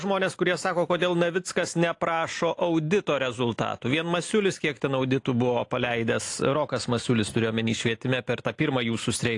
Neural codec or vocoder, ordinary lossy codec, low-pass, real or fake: none; AAC, 48 kbps; 10.8 kHz; real